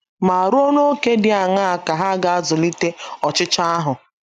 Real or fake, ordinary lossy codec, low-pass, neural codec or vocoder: real; none; 14.4 kHz; none